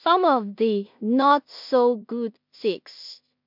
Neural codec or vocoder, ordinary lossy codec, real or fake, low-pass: codec, 16 kHz in and 24 kHz out, 0.4 kbps, LongCat-Audio-Codec, two codebook decoder; MP3, 48 kbps; fake; 5.4 kHz